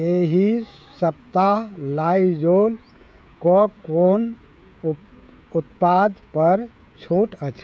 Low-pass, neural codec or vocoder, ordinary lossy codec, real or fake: none; codec, 16 kHz, 16 kbps, FreqCodec, smaller model; none; fake